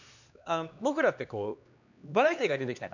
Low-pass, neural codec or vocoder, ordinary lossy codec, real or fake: 7.2 kHz; codec, 16 kHz, 2 kbps, X-Codec, HuBERT features, trained on LibriSpeech; none; fake